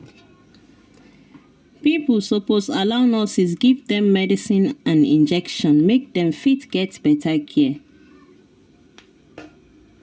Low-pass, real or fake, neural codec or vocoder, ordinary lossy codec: none; real; none; none